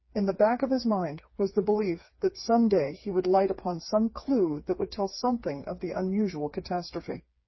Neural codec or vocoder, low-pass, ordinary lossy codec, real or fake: codec, 16 kHz, 4 kbps, FreqCodec, smaller model; 7.2 kHz; MP3, 24 kbps; fake